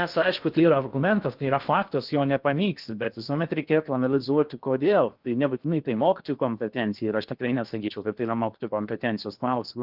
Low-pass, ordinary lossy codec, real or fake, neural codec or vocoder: 5.4 kHz; Opus, 24 kbps; fake; codec, 16 kHz in and 24 kHz out, 0.6 kbps, FocalCodec, streaming, 2048 codes